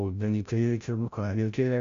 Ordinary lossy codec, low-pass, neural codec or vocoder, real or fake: MP3, 64 kbps; 7.2 kHz; codec, 16 kHz, 0.5 kbps, FreqCodec, larger model; fake